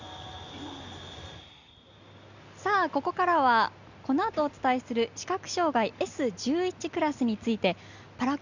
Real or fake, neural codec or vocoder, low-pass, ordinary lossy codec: real; none; 7.2 kHz; Opus, 64 kbps